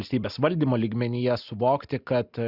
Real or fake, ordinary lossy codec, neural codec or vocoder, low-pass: real; Opus, 64 kbps; none; 5.4 kHz